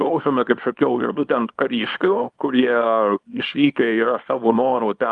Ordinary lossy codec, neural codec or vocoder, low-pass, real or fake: Opus, 32 kbps; codec, 24 kHz, 0.9 kbps, WavTokenizer, small release; 10.8 kHz; fake